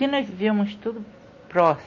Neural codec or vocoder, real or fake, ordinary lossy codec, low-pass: none; real; MP3, 32 kbps; 7.2 kHz